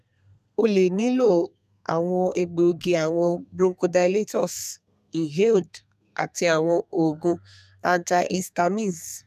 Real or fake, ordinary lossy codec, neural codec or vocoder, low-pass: fake; none; codec, 32 kHz, 1.9 kbps, SNAC; 14.4 kHz